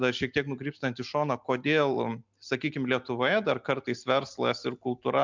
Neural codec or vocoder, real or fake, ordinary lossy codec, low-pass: none; real; MP3, 64 kbps; 7.2 kHz